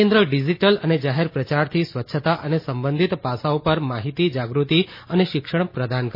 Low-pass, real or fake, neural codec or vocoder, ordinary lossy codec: 5.4 kHz; real; none; MP3, 24 kbps